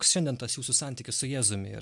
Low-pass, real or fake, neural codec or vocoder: 10.8 kHz; real; none